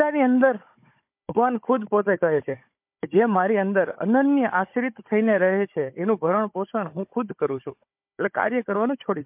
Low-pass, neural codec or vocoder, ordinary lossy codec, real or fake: 3.6 kHz; codec, 16 kHz, 16 kbps, FunCodec, trained on Chinese and English, 50 frames a second; MP3, 32 kbps; fake